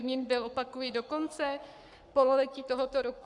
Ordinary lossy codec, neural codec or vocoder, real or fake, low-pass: Opus, 64 kbps; codec, 44.1 kHz, 7.8 kbps, Pupu-Codec; fake; 10.8 kHz